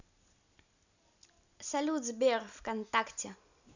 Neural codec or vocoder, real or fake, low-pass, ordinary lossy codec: none; real; 7.2 kHz; none